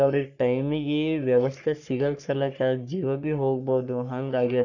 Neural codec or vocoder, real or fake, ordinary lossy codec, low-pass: codec, 44.1 kHz, 3.4 kbps, Pupu-Codec; fake; none; 7.2 kHz